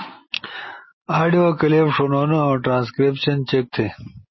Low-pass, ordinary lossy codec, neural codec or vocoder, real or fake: 7.2 kHz; MP3, 24 kbps; none; real